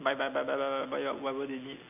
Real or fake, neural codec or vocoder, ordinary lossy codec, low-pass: real; none; none; 3.6 kHz